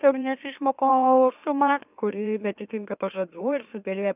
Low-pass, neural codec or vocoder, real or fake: 3.6 kHz; autoencoder, 44.1 kHz, a latent of 192 numbers a frame, MeloTTS; fake